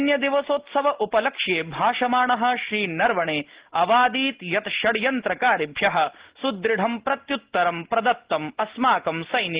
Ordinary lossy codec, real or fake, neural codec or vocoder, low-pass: Opus, 16 kbps; real; none; 3.6 kHz